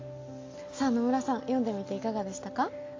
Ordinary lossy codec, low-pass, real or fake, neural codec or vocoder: AAC, 32 kbps; 7.2 kHz; real; none